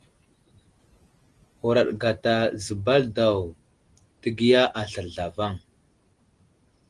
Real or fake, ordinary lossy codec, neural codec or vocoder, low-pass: real; Opus, 24 kbps; none; 10.8 kHz